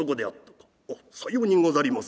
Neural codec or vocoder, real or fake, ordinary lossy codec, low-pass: none; real; none; none